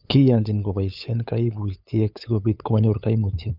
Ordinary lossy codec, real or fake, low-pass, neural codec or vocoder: none; fake; 5.4 kHz; codec, 16 kHz, 8 kbps, FunCodec, trained on LibriTTS, 25 frames a second